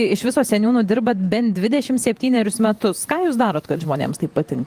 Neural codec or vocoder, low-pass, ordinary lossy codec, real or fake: vocoder, 48 kHz, 128 mel bands, Vocos; 14.4 kHz; Opus, 32 kbps; fake